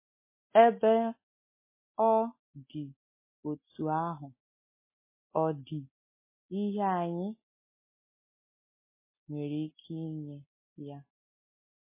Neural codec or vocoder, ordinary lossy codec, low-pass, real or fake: none; MP3, 16 kbps; 3.6 kHz; real